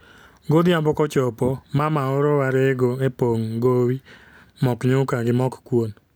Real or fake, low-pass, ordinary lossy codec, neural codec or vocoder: real; none; none; none